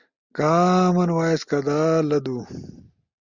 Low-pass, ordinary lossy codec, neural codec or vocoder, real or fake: 7.2 kHz; Opus, 64 kbps; none; real